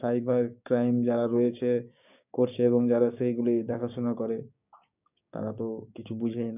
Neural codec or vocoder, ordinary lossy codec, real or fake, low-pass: codec, 16 kHz, 6 kbps, DAC; none; fake; 3.6 kHz